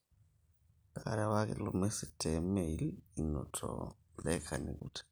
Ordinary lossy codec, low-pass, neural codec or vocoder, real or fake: none; none; none; real